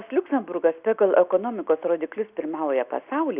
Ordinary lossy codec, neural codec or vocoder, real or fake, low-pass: Opus, 64 kbps; none; real; 3.6 kHz